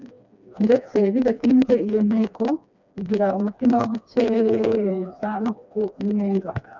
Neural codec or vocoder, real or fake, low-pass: codec, 16 kHz, 2 kbps, FreqCodec, smaller model; fake; 7.2 kHz